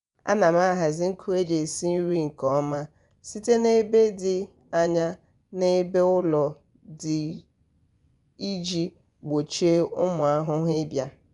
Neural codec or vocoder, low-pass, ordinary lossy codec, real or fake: vocoder, 24 kHz, 100 mel bands, Vocos; 10.8 kHz; none; fake